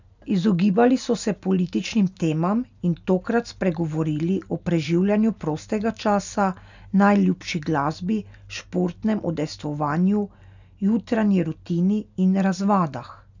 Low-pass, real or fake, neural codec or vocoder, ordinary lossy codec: 7.2 kHz; real; none; none